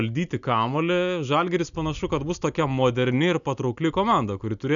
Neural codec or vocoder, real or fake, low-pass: none; real; 7.2 kHz